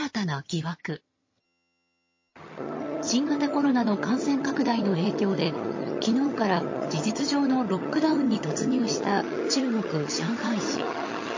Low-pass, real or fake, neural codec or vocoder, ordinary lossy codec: 7.2 kHz; fake; vocoder, 22.05 kHz, 80 mel bands, HiFi-GAN; MP3, 32 kbps